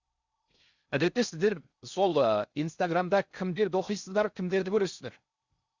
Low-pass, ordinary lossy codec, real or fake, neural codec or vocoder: 7.2 kHz; Opus, 64 kbps; fake; codec, 16 kHz in and 24 kHz out, 0.6 kbps, FocalCodec, streaming, 4096 codes